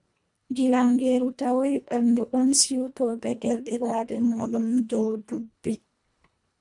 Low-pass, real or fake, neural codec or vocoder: 10.8 kHz; fake; codec, 24 kHz, 1.5 kbps, HILCodec